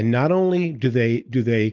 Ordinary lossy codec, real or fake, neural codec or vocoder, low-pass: Opus, 24 kbps; real; none; 7.2 kHz